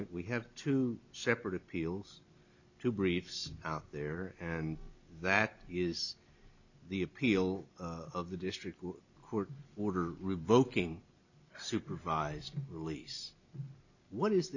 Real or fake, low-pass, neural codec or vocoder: real; 7.2 kHz; none